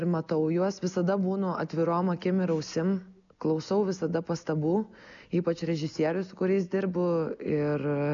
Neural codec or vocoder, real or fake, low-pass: none; real; 7.2 kHz